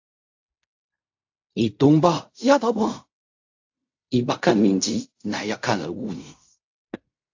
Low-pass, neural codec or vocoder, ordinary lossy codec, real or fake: 7.2 kHz; codec, 16 kHz in and 24 kHz out, 0.4 kbps, LongCat-Audio-Codec, fine tuned four codebook decoder; AAC, 48 kbps; fake